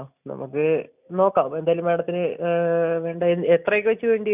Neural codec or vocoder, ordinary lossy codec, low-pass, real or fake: none; none; 3.6 kHz; real